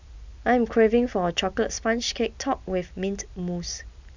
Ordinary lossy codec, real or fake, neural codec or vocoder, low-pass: none; real; none; 7.2 kHz